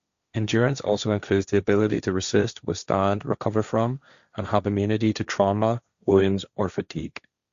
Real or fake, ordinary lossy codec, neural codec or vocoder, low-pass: fake; Opus, 64 kbps; codec, 16 kHz, 1.1 kbps, Voila-Tokenizer; 7.2 kHz